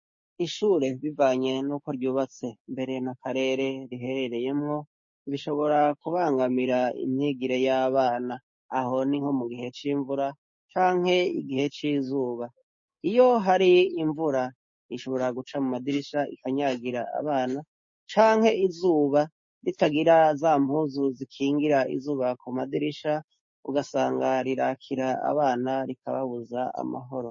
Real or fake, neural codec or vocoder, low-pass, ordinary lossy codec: fake; codec, 16 kHz, 6 kbps, DAC; 7.2 kHz; MP3, 32 kbps